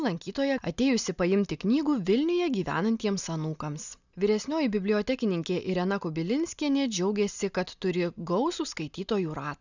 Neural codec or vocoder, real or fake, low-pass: none; real; 7.2 kHz